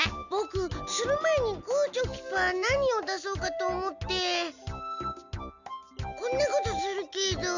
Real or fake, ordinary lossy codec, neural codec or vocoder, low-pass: real; none; none; 7.2 kHz